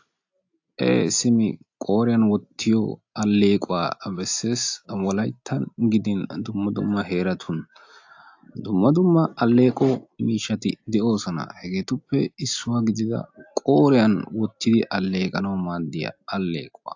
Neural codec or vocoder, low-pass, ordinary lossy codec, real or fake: none; 7.2 kHz; AAC, 48 kbps; real